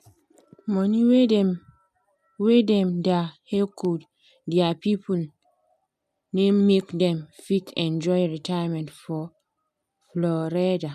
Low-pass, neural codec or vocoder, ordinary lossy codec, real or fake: 14.4 kHz; none; none; real